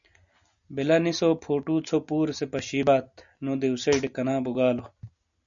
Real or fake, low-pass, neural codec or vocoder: real; 7.2 kHz; none